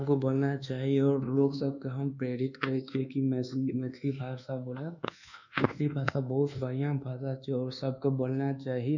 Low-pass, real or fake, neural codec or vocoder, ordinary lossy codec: 7.2 kHz; fake; codec, 24 kHz, 1.2 kbps, DualCodec; none